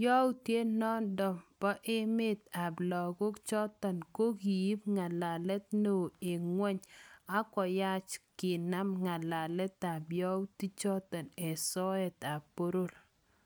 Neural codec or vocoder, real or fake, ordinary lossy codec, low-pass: none; real; none; none